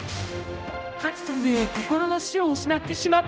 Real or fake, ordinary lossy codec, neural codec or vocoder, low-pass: fake; none; codec, 16 kHz, 0.5 kbps, X-Codec, HuBERT features, trained on general audio; none